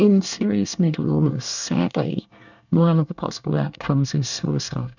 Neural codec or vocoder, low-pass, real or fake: codec, 24 kHz, 1 kbps, SNAC; 7.2 kHz; fake